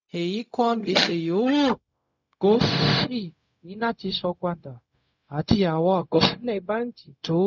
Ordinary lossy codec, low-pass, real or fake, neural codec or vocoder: none; 7.2 kHz; fake; codec, 16 kHz, 0.4 kbps, LongCat-Audio-Codec